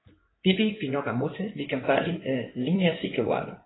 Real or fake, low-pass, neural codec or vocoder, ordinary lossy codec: fake; 7.2 kHz; codec, 16 kHz in and 24 kHz out, 2.2 kbps, FireRedTTS-2 codec; AAC, 16 kbps